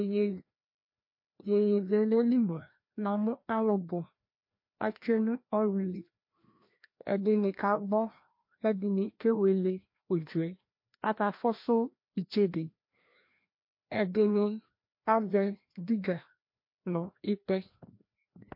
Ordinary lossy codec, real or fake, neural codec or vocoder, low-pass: MP3, 32 kbps; fake; codec, 16 kHz, 1 kbps, FreqCodec, larger model; 5.4 kHz